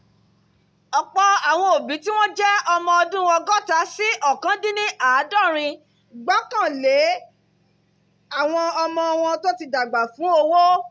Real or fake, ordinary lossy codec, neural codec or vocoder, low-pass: real; none; none; none